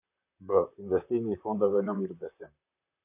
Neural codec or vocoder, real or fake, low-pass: vocoder, 44.1 kHz, 128 mel bands, Pupu-Vocoder; fake; 3.6 kHz